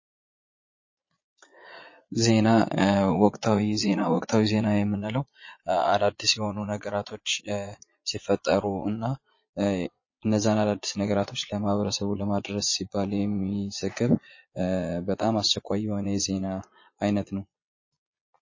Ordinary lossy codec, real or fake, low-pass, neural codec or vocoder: MP3, 32 kbps; fake; 7.2 kHz; vocoder, 22.05 kHz, 80 mel bands, Vocos